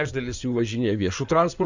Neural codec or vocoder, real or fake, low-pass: codec, 16 kHz in and 24 kHz out, 2.2 kbps, FireRedTTS-2 codec; fake; 7.2 kHz